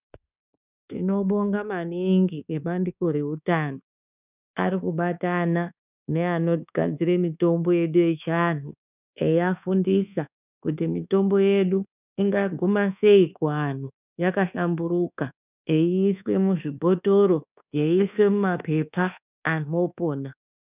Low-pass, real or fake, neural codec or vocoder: 3.6 kHz; fake; codec, 24 kHz, 1.2 kbps, DualCodec